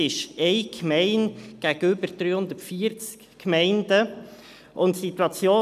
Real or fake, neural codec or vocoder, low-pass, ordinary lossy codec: real; none; 14.4 kHz; none